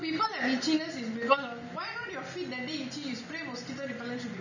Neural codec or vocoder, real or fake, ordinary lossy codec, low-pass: none; real; none; 7.2 kHz